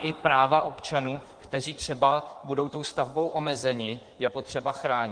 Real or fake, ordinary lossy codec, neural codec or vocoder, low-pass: fake; Opus, 24 kbps; codec, 16 kHz in and 24 kHz out, 1.1 kbps, FireRedTTS-2 codec; 9.9 kHz